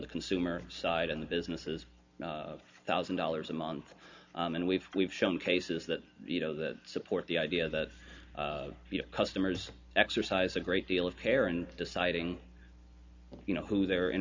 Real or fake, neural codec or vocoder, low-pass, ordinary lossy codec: real; none; 7.2 kHz; MP3, 64 kbps